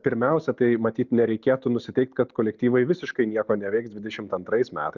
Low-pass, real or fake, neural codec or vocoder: 7.2 kHz; real; none